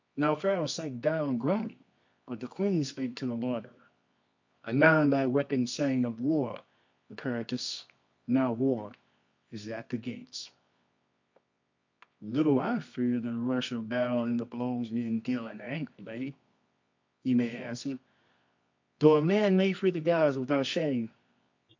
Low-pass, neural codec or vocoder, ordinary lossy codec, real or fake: 7.2 kHz; codec, 24 kHz, 0.9 kbps, WavTokenizer, medium music audio release; MP3, 48 kbps; fake